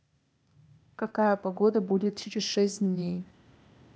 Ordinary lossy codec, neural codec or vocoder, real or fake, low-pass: none; codec, 16 kHz, 0.8 kbps, ZipCodec; fake; none